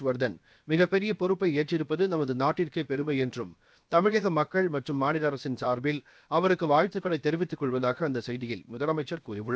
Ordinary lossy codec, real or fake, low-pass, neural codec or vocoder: none; fake; none; codec, 16 kHz, 0.7 kbps, FocalCodec